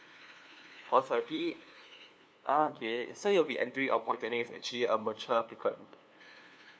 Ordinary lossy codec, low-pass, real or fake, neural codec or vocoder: none; none; fake; codec, 16 kHz, 2 kbps, FunCodec, trained on LibriTTS, 25 frames a second